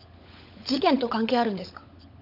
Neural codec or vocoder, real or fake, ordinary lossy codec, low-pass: codec, 16 kHz, 16 kbps, FunCodec, trained on LibriTTS, 50 frames a second; fake; none; 5.4 kHz